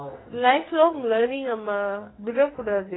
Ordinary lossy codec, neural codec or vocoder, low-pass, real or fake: AAC, 16 kbps; codec, 44.1 kHz, 2.6 kbps, SNAC; 7.2 kHz; fake